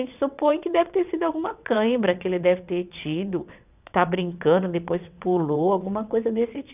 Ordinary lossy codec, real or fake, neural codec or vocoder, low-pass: none; fake; vocoder, 22.05 kHz, 80 mel bands, WaveNeXt; 3.6 kHz